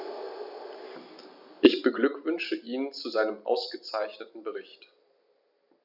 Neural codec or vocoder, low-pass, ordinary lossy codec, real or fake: none; 5.4 kHz; none; real